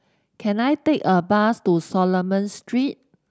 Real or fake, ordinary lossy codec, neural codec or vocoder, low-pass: real; none; none; none